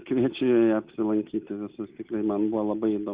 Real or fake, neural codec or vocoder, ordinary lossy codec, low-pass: real; none; Opus, 64 kbps; 3.6 kHz